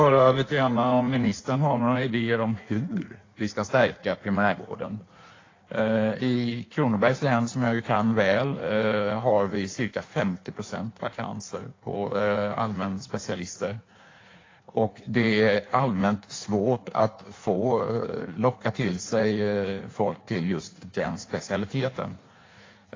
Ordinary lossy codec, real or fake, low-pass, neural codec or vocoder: AAC, 32 kbps; fake; 7.2 kHz; codec, 16 kHz in and 24 kHz out, 1.1 kbps, FireRedTTS-2 codec